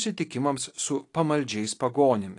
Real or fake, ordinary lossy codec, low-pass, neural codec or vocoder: real; AAC, 48 kbps; 10.8 kHz; none